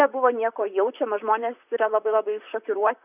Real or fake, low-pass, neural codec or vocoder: fake; 3.6 kHz; vocoder, 44.1 kHz, 128 mel bands, Pupu-Vocoder